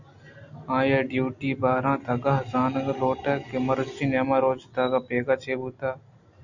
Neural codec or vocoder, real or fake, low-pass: none; real; 7.2 kHz